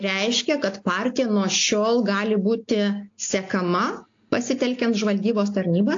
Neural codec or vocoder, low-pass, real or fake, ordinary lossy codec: none; 7.2 kHz; real; AAC, 48 kbps